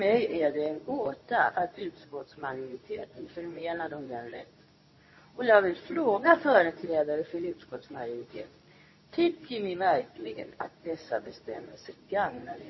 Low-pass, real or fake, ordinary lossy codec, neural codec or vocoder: 7.2 kHz; fake; MP3, 24 kbps; codec, 24 kHz, 0.9 kbps, WavTokenizer, medium speech release version 2